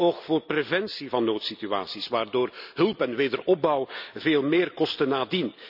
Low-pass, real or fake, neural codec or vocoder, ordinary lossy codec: 5.4 kHz; real; none; none